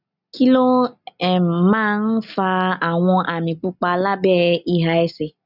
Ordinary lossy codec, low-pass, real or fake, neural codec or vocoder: none; 5.4 kHz; real; none